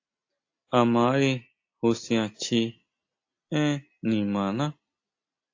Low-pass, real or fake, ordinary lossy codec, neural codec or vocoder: 7.2 kHz; real; AAC, 48 kbps; none